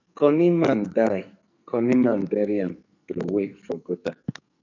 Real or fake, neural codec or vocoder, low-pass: fake; codec, 44.1 kHz, 2.6 kbps, SNAC; 7.2 kHz